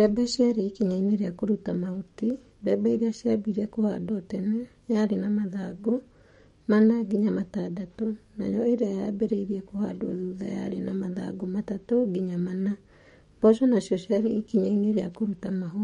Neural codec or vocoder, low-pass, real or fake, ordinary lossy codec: codec, 44.1 kHz, 7.8 kbps, DAC; 19.8 kHz; fake; MP3, 48 kbps